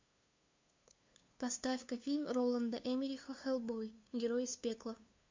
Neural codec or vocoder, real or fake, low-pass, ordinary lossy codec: codec, 16 kHz, 2 kbps, FunCodec, trained on LibriTTS, 25 frames a second; fake; 7.2 kHz; MP3, 48 kbps